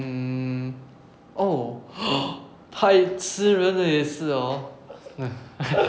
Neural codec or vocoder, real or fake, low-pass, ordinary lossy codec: none; real; none; none